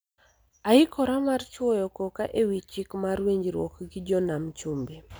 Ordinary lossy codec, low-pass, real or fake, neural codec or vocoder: none; none; real; none